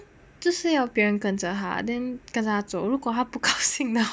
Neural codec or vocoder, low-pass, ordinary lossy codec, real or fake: none; none; none; real